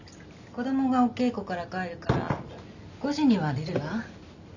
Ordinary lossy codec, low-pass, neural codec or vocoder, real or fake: none; 7.2 kHz; none; real